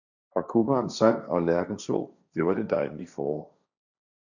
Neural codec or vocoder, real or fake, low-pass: codec, 16 kHz, 1.1 kbps, Voila-Tokenizer; fake; 7.2 kHz